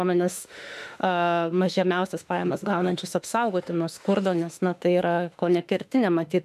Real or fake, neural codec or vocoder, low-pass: fake; autoencoder, 48 kHz, 32 numbers a frame, DAC-VAE, trained on Japanese speech; 14.4 kHz